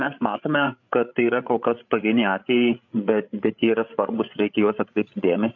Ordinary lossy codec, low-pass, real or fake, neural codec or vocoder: AAC, 48 kbps; 7.2 kHz; fake; codec, 16 kHz, 8 kbps, FreqCodec, larger model